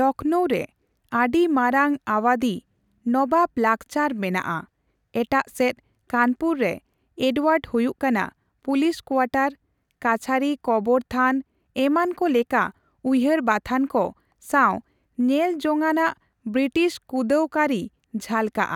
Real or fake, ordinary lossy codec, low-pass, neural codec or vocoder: real; none; 19.8 kHz; none